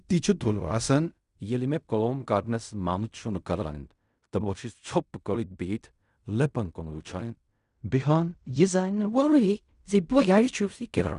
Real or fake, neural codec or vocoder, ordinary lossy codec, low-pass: fake; codec, 16 kHz in and 24 kHz out, 0.4 kbps, LongCat-Audio-Codec, fine tuned four codebook decoder; none; 10.8 kHz